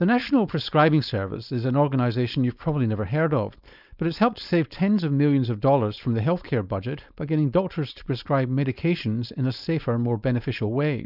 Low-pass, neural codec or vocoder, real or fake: 5.4 kHz; codec, 16 kHz, 4.8 kbps, FACodec; fake